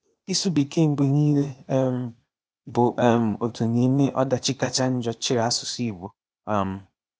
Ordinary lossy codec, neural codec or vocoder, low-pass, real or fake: none; codec, 16 kHz, 0.8 kbps, ZipCodec; none; fake